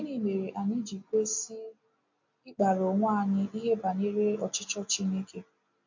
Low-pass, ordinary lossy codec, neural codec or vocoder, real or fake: 7.2 kHz; MP3, 48 kbps; none; real